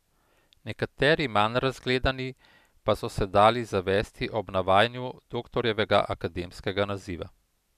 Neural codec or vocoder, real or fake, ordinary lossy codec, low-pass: none; real; none; 14.4 kHz